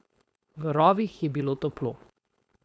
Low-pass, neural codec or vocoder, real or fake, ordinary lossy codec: none; codec, 16 kHz, 4.8 kbps, FACodec; fake; none